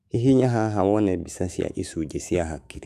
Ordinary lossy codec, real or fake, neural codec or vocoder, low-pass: none; fake; autoencoder, 48 kHz, 128 numbers a frame, DAC-VAE, trained on Japanese speech; 14.4 kHz